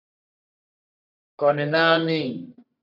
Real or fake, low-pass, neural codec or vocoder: fake; 5.4 kHz; codec, 44.1 kHz, 1.7 kbps, Pupu-Codec